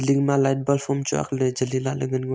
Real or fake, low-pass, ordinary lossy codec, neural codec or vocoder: real; none; none; none